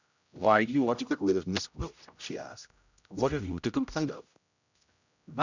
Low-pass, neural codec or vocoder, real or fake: 7.2 kHz; codec, 16 kHz, 0.5 kbps, X-Codec, HuBERT features, trained on general audio; fake